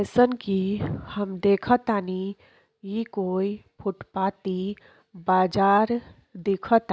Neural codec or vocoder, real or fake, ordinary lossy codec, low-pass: none; real; none; none